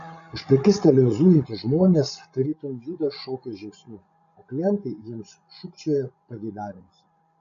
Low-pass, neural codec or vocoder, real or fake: 7.2 kHz; codec, 16 kHz, 16 kbps, FreqCodec, larger model; fake